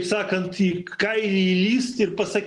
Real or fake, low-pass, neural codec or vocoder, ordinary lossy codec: real; 10.8 kHz; none; Opus, 32 kbps